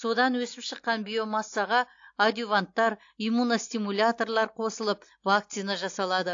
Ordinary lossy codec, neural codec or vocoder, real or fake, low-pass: AAC, 48 kbps; none; real; 7.2 kHz